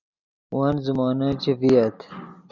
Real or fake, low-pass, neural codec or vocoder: real; 7.2 kHz; none